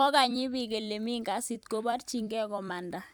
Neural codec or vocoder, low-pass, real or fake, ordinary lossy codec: vocoder, 44.1 kHz, 128 mel bands every 256 samples, BigVGAN v2; none; fake; none